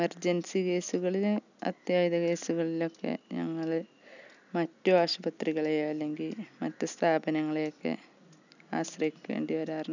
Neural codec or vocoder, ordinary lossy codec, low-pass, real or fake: none; none; 7.2 kHz; real